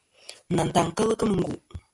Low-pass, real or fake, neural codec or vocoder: 10.8 kHz; real; none